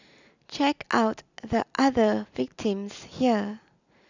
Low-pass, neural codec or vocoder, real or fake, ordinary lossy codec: 7.2 kHz; none; real; none